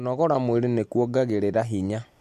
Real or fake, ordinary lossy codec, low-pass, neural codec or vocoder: real; MP3, 64 kbps; 14.4 kHz; none